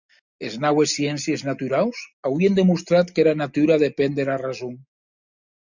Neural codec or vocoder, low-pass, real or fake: none; 7.2 kHz; real